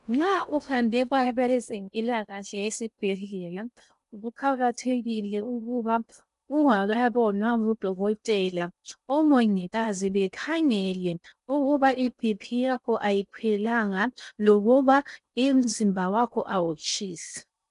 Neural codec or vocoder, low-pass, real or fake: codec, 16 kHz in and 24 kHz out, 0.6 kbps, FocalCodec, streaming, 2048 codes; 10.8 kHz; fake